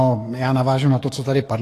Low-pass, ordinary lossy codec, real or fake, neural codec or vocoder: 14.4 kHz; AAC, 48 kbps; fake; codec, 44.1 kHz, 7.8 kbps, DAC